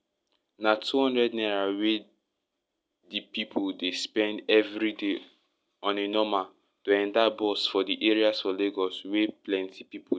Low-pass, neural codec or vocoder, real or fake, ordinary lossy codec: none; none; real; none